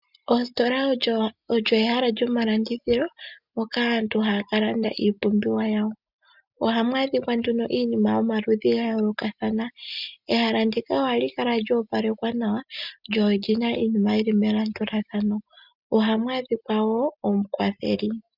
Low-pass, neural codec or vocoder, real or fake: 5.4 kHz; none; real